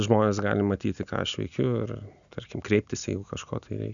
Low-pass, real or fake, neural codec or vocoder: 7.2 kHz; real; none